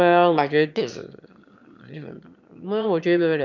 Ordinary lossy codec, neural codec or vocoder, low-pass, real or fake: none; autoencoder, 22.05 kHz, a latent of 192 numbers a frame, VITS, trained on one speaker; 7.2 kHz; fake